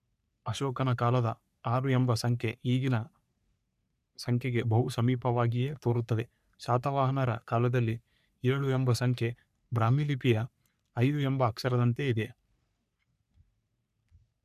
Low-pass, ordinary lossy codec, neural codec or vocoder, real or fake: 14.4 kHz; none; codec, 44.1 kHz, 3.4 kbps, Pupu-Codec; fake